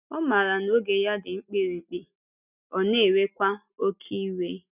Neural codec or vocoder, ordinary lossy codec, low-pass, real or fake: none; none; 3.6 kHz; real